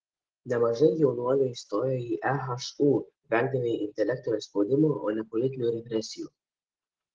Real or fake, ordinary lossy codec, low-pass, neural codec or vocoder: real; Opus, 16 kbps; 7.2 kHz; none